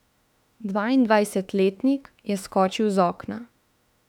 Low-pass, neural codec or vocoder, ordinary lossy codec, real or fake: 19.8 kHz; autoencoder, 48 kHz, 32 numbers a frame, DAC-VAE, trained on Japanese speech; none; fake